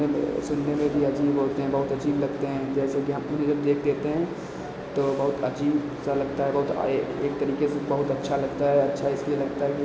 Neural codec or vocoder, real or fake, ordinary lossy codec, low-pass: none; real; none; none